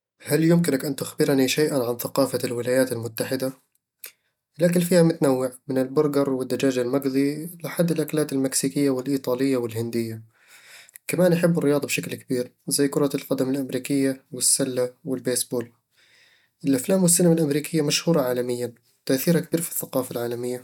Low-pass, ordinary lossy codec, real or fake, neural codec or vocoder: 19.8 kHz; none; real; none